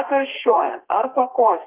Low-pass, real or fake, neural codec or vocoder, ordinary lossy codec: 3.6 kHz; fake; codec, 32 kHz, 1.9 kbps, SNAC; Opus, 32 kbps